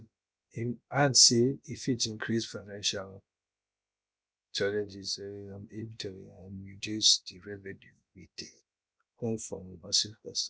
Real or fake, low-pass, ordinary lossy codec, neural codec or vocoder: fake; none; none; codec, 16 kHz, about 1 kbps, DyCAST, with the encoder's durations